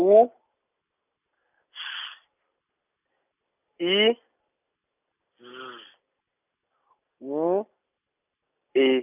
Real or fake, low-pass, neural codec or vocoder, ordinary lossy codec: real; 3.6 kHz; none; none